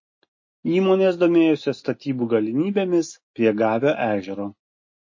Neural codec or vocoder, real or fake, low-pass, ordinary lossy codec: none; real; 7.2 kHz; MP3, 32 kbps